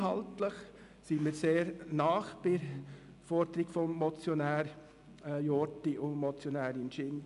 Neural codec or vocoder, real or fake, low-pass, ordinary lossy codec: none; real; 10.8 kHz; MP3, 96 kbps